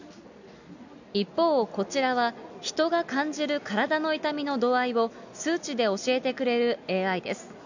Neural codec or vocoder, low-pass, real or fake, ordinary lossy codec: none; 7.2 kHz; real; none